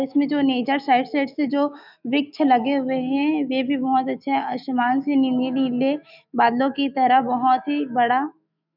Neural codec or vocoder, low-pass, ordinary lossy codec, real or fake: autoencoder, 48 kHz, 128 numbers a frame, DAC-VAE, trained on Japanese speech; 5.4 kHz; none; fake